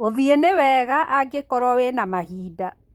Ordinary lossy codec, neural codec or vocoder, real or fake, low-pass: Opus, 32 kbps; vocoder, 44.1 kHz, 128 mel bands, Pupu-Vocoder; fake; 19.8 kHz